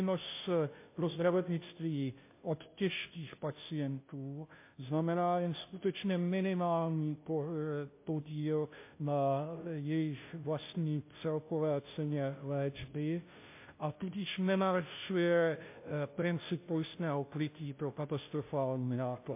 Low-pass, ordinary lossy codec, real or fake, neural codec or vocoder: 3.6 kHz; MP3, 24 kbps; fake; codec, 16 kHz, 0.5 kbps, FunCodec, trained on Chinese and English, 25 frames a second